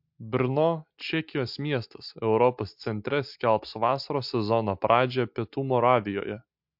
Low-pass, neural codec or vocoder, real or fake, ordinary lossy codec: 5.4 kHz; none; real; MP3, 48 kbps